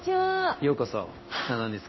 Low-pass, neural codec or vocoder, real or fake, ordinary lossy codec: 7.2 kHz; codec, 16 kHz in and 24 kHz out, 1 kbps, XY-Tokenizer; fake; MP3, 24 kbps